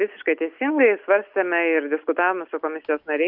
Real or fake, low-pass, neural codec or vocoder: real; 5.4 kHz; none